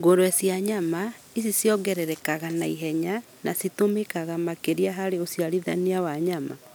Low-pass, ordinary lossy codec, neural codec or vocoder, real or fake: none; none; none; real